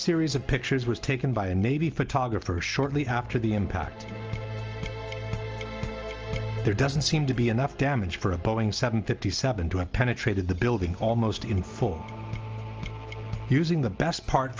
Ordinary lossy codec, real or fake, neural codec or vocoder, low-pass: Opus, 24 kbps; real; none; 7.2 kHz